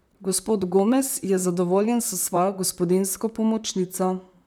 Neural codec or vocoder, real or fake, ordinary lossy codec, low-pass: vocoder, 44.1 kHz, 128 mel bands, Pupu-Vocoder; fake; none; none